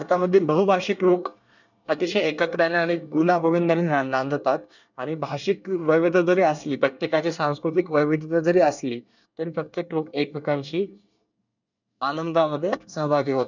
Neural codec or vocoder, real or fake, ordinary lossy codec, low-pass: codec, 24 kHz, 1 kbps, SNAC; fake; none; 7.2 kHz